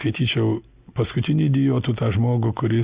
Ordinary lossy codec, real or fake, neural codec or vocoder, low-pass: Opus, 64 kbps; real; none; 3.6 kHz